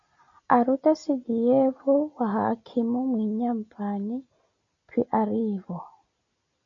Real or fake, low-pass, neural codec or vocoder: real; 7.2 kHz; none